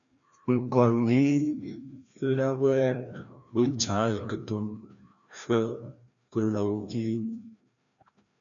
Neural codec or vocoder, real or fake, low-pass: codec, 16 kHz, 1 kbps, FreqCodec, larger model; fake; 7.2 kHz